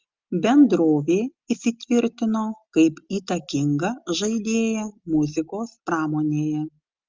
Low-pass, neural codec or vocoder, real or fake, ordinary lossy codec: 7.2 kHz; none; real; Opus, 32 kbps